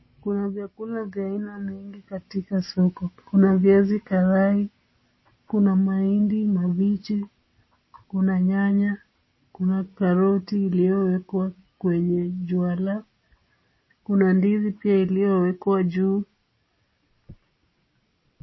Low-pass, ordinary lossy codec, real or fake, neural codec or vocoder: 7.2 kHz; MP3, 24 kbps; real; none